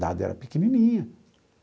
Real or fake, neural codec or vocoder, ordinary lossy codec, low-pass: real; none; none; none